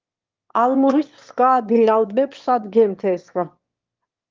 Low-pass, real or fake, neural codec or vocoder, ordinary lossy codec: 7.2 kHz; fake; autoencoder, 22.05 kHz, a latent of 192 numbers a frame, VITS, trained on one speaker; Opus, 24 kbps